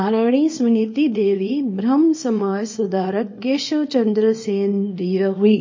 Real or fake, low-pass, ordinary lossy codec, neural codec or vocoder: fake; 7.2 kHz; MP3, 32 kbps; codec, 24 kHz, 0.9 kbps, WavTokenizer, small release